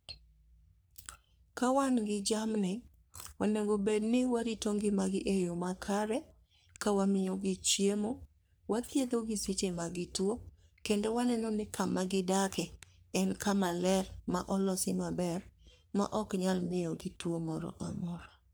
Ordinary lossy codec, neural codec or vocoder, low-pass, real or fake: none; codec, 44.1 kHz, 3.4 kbps, Pupu-Codec; none; fake